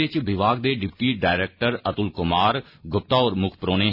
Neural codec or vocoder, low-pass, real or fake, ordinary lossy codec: none; 5.4 kHz; real; none